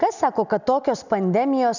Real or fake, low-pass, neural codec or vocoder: real; 7.2 kHz; none